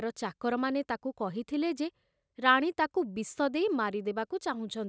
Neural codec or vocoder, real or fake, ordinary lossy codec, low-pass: none; real; none; none